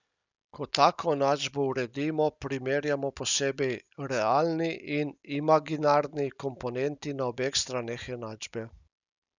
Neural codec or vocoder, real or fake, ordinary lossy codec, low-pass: none; real; none; 7.2 kHz